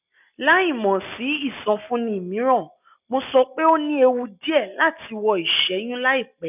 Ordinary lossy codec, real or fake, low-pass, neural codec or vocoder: none; real; 3.6 kHz; none